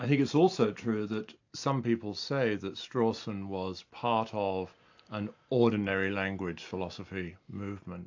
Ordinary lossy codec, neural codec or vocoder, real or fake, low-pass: AAC, 48 kbps; none; real; 7.2 kHz